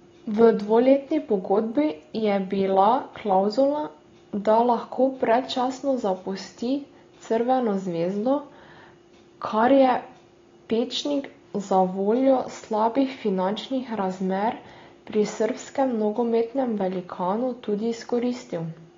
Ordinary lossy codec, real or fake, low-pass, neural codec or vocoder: AAC, 32 kbps; real; 7.2 kHz; none